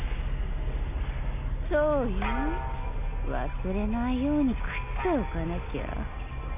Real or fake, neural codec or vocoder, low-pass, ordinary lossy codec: real; none; 3.6 kHz; none